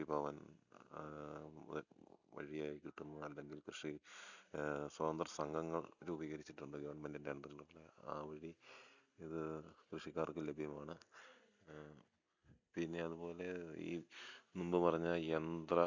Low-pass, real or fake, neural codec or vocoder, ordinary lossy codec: 7.2 kHz; real; none; none